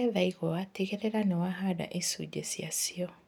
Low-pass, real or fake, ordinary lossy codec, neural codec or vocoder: none; real; none; none